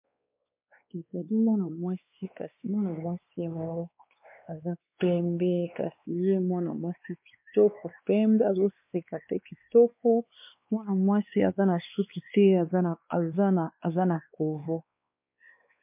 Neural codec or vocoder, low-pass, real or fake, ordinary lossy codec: codec, 16 kHz, 2 kbps, X-Codec, WavLM features, trained on Multilingual LibriSpeech; 3.6 kHz; fake; MP3, 32 kbps